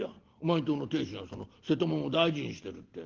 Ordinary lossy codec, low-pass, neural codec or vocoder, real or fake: Opus, 16 kbps; 7.2 kHz; none; real